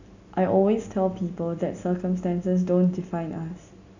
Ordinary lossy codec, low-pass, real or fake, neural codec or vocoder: none; 7.2 kHz; real; none